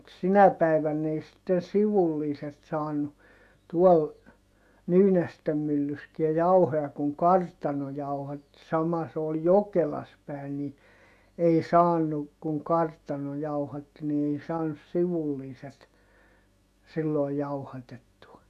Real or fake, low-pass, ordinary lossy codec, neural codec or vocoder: fake; 14.4 kHz; none; autoencoder, 48 kHz, 128 numbers a frame, DAC-VAE, trained on Japanese speech